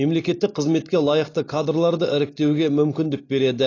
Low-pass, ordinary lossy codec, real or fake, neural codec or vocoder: 7.2 kHz; AAC, 32 kbps; real; none